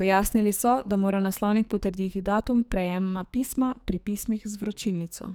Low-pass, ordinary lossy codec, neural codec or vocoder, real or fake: none; none; codec, 44.1 kHz, 2.6 kbps, SNAC; fake